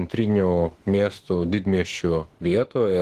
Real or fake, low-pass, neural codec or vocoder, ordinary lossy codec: fake; 14.4 kHz; autoencoder, 48 kHz, 32 numbers a frame, DAC-VAE, trained on Japanese speech; Opus, 16 kbps